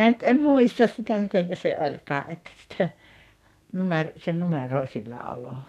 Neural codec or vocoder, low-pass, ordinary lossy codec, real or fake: codec, 32 kHz, 1.9 kbps, SNAC; 14.4 kHz; none; fake